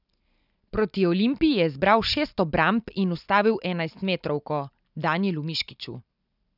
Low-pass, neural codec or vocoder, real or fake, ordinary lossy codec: 5.4 kHz; none; real; none